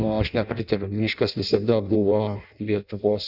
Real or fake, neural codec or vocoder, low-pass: fake; codec, 16 kHz in and 24 kHz out, 0.6 kbps, FireRedTTS-2 codec; 5.4 kHz